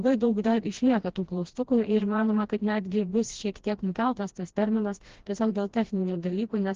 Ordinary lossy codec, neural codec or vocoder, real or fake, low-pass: Opus, 16 kbps; codec, 16 kHz, 1 kbps, FreqCodec, smaller model; fake; 7.2 kHz